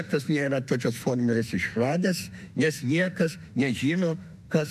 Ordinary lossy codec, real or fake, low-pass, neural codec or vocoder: MP3, 96 kbps; fake; 14.4 kHz; autoencoder, 48 kHz, 32 numbers a frame, DAC-VAE, trained on Japanese speech